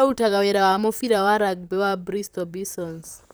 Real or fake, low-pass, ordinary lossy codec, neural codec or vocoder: fake; none; none; vocoder, 44.1 kHz, 128 mel bands, Pupu-Vocoder